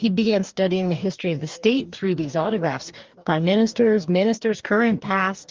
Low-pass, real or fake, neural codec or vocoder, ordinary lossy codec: 7.2 kHz; fake; codec, 44.1 kHz, 2.6 kbps, DAC; Opus, 32 kbps